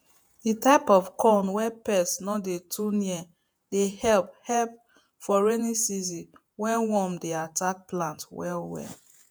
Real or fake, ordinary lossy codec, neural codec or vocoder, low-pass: fake; none; vocoder, 48 kHz, 128 mel bands, Vocos; none